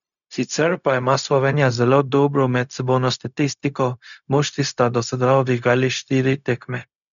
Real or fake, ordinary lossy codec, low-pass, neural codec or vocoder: fake; none; 7.2 kHz; codec, 16 kHz, 0.4 kbps, LongCat-Audio-Codec